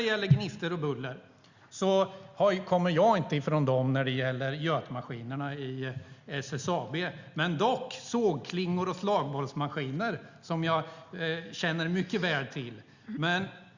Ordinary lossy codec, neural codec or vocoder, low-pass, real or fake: Opus, 64 kbps; none; 7.2 kHz; real